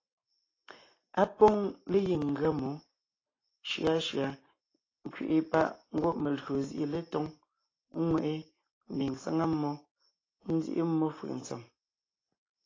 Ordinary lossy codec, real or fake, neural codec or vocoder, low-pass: AAC, 32 kbps; real; none; 7.2 kHz